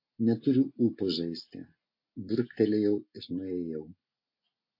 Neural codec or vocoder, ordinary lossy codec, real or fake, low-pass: none; MP3, 32 kbps; real; 5.4 kHz